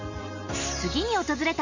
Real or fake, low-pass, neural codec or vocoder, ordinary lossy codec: real; 7.2 kHz; none; none